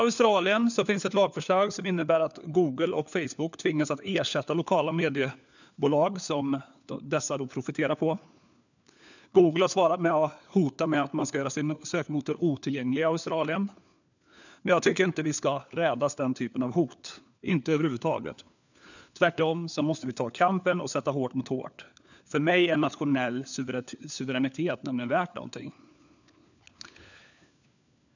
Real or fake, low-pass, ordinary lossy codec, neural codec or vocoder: fake; 7.2 kHz; none; codec, 16 kHz, 4 kbps, FunCodec, trained on LibriTTS, 50 frames a second